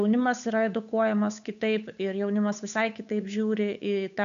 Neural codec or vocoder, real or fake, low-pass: codec, 16 kHz, 8 kbps, FunCodec, trained on Chinese and English, 25 frames a second; fake; 7.2 kHz